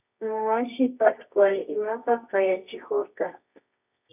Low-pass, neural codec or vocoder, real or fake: 3.6 kHz; codec, 24 kHz, 0.9 kbps, WavTokenizer, medium music audio release; fake